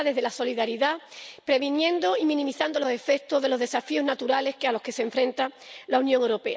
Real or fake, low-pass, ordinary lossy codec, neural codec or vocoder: real; none; none; none